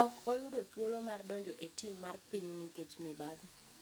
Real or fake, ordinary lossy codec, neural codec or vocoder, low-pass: fake; none; codec, 44.1 kHz, 2.6 kbps, SNAC; none